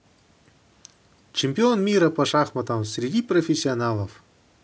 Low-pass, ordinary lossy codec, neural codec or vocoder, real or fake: none; none; none; real